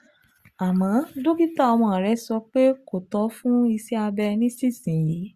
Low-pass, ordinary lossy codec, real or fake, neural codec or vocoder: 14.4 kHz; Opus, 64 kbps; real; none